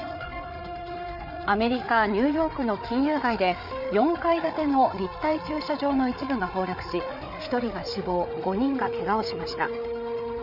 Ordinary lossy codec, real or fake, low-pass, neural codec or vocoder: none; fake; 5.4 kHz; codec, 16 kHz, 8 kbps, FreqCodec, larger model